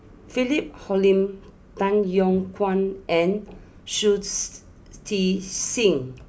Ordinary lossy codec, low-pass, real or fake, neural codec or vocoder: none; none; real; none